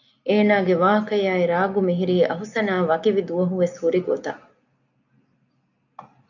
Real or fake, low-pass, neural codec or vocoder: real; 7.2 kHz; none